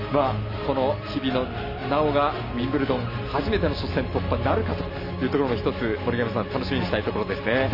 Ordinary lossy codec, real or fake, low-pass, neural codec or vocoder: none; real; 5.4 kHz; none